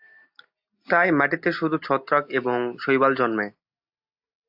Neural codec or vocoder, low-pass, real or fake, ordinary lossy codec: none; 5.4 kHz; real; AAC, 48 kbps